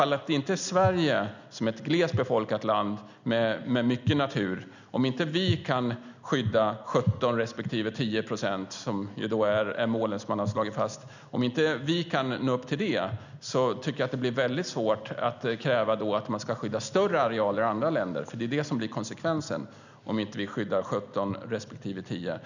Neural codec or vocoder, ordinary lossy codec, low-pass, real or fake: none; none; 7.2 kHz; real